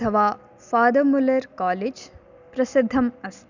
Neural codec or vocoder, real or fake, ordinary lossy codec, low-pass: none; real; none; 7.2 kHz